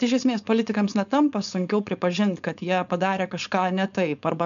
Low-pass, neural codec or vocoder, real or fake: 7.2 kHz; codec, 16 kHz, 4.8 kbps, FACodec; fake